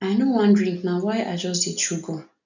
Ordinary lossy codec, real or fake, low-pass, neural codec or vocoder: none; real; 7.2 kHz; none